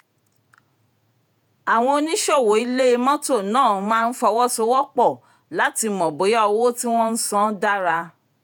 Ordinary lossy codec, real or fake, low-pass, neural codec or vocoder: none; fake; none; vocoder, 48 kHz, 128 mel bands, Vocos